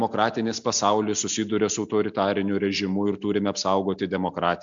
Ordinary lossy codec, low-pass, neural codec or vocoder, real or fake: MP3, 64 kbps; 7.2 kHz; none; real